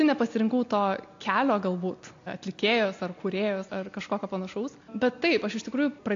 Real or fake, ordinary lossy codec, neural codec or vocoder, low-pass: real; AAC, 48 kbps; none; 7.2 kHz